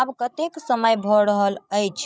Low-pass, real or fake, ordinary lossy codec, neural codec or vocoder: none; fake; none; codec, 16 kHz, 16 kbps, FreqCodec, larger model